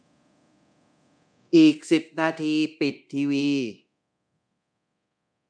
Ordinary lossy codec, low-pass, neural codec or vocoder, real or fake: none; 9.9 kHz; codec, 24 kHz, 0.9 kbps, DualCodec; fake